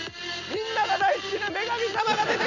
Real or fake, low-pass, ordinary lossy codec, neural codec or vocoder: real; 7.2 kHz; none; none